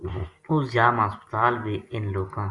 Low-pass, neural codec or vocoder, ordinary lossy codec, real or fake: 10.8 kHz; none; Opus, 64 kbps; real